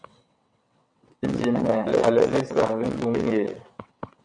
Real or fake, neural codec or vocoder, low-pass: fake; vocoder, 22.05 kHz, 80 mel bands, WaveNeXt; 9.9 kHz